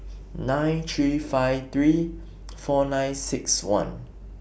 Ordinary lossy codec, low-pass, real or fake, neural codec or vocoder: none; none; real; none